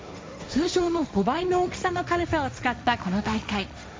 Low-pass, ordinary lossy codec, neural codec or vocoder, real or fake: none; none; codec, 16 kHz, 1.1 kbps, Voila-Tokenizer; fake